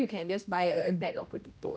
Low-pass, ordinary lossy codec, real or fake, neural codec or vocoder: none; none; fake; codec, 16 kHz, 1 kbps, X-Codec, HuBERT features, trained on general audio